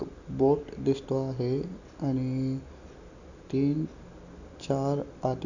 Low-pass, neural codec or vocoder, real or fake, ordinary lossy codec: 7.2 kHz; none; real; none